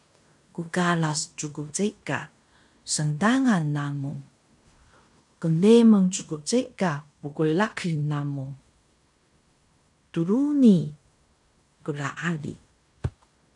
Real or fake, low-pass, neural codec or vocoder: fake; 10.8 kHz; codec, 16 kHz in and 24 kHz out, 0.9 kbps, LongCat-Audio-Codec, fine tuned four codebook decoder